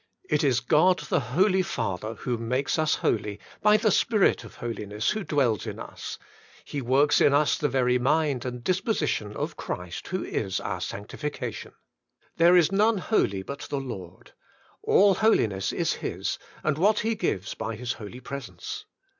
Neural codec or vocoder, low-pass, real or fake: none; 7.2 kHz; real